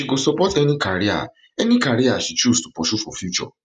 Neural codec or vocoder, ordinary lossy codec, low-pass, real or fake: none; none; 10.8 kHz; real